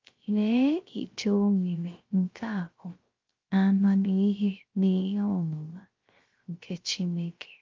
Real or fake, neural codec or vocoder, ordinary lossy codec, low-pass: fake; codec, 16 kHz, 0.3 kbps, FocalCodec; Opus, 32 kbps; 7.2 kHz